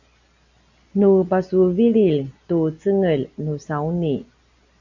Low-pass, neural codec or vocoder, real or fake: 7.2 kHz; none; real